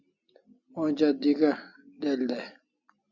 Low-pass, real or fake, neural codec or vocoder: 7.2 kHz; real; none